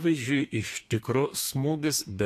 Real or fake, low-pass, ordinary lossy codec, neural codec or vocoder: fake; 14.4 kHz; AAC, 64 kbps; codec, 32 kHz, 1.9 kbps, SNAC